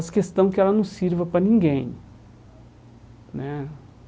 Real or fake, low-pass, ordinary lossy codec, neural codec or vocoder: real; none; none; none